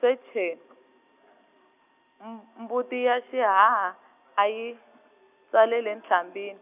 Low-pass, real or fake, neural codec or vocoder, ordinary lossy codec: 3.6 kHz; real; none; none